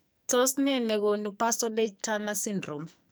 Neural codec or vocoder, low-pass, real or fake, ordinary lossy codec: codec, 44.1 kHz, 2.6 kbps, SNAC; none; fake; none